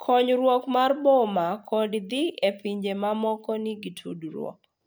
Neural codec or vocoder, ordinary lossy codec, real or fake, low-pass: none; none; real; none